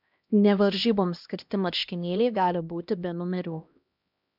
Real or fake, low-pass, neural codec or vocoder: fake; 5.4 kHz; codec, 16 kHz, 1 kbps, X-Codec, HuBERT features, trained on LibriSpeech